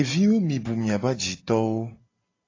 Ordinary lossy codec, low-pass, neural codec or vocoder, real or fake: AAC, 32 kbps; 7.2 kHz; none; real